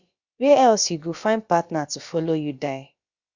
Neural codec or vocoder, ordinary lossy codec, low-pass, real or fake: codec, 16 kHz, about 1 kbps, DyCAST, with the encoder's durations; Opus, 64 kbps; 7.2 kHz; fake